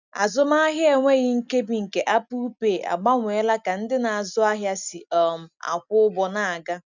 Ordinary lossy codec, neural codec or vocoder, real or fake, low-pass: none; none; real; 7.2 kHz